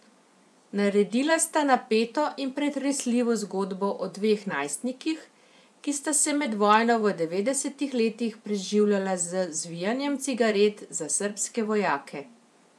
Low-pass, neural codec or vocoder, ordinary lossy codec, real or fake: none; vocoder, 24 kHz, 100 mel bands, Vocos; none; fake